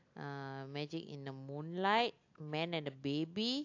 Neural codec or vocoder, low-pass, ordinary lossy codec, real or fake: none; 7.2 kHz; AAC, 48 kbps; real